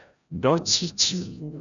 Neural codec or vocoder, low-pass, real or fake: codec, 16 kHz, 0.5 kbps, FreqCodec, larger model; 7.2 kHz; fake